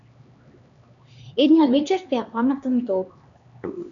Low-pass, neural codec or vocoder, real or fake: 7.2 kHz; codec, 16 kHz, 2 kbps, X-Codec, HuBERT features, trained on LibriSpeech; fake